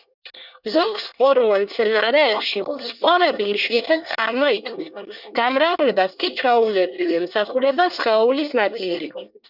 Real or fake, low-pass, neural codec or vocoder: fake; 5.4 kHz; codec, 24 kHz, 1 kbps, SNAC